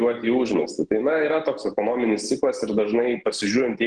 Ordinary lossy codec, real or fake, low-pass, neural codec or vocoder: Opus, 16 kbps; real; 9.9 kHz; none